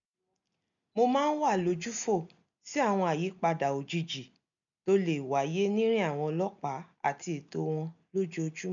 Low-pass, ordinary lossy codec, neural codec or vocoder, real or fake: 7.2 kHz; none; none; real